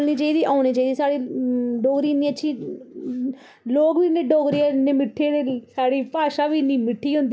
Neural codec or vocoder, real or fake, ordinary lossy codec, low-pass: none; real; none; none